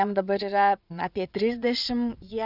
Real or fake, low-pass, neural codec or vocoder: fake; 5.4 kHz; vocoder, 22.05 kHz, 80 mel bands, Vocos